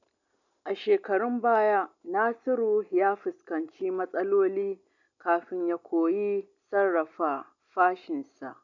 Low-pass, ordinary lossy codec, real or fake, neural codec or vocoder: 7.2 kHz; none; real; none